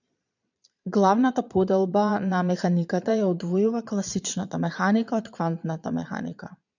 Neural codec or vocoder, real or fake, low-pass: vocoder, 22.05 kHz, 80 mel bands, Vocos; fake; 7.2 kHz